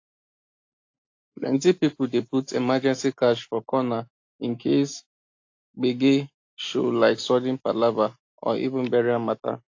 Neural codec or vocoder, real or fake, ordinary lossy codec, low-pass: none; real; AAC, 48 kbps; 7.2 kHz